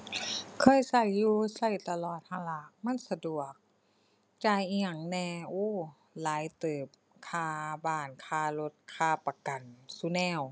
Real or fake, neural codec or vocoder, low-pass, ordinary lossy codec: real; none; none; none